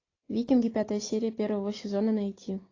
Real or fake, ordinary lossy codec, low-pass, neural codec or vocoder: real; AAC, 32 kbps; 7.2 kHz; none